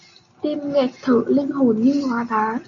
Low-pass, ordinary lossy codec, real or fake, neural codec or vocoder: 7.2 kHz; AAC, 48 kbps; real; none